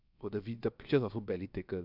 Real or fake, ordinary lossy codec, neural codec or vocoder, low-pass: fake; none; codec, 16 kHz, about 1 kbps, DyCAST, with the encoder's durations; 5.4 kHz